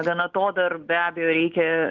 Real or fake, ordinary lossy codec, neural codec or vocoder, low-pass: real; Opus, 32 kbps; none; 7.2 kHz